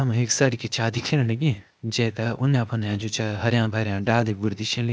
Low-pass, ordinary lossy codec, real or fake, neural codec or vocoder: none; none; fake; codec, 16 kHz, 0.8 kbps, ZipCodec